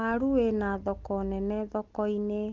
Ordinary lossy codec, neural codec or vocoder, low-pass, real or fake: Opus, 16 kbps; none; 7.2 kHz; real